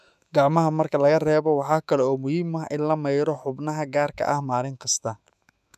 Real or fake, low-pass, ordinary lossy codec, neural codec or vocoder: fake; 14.4 kHz; none; autoencoder, 48 kHz, 128 numbers a frame, DAC-VAE, trained on Japanese speech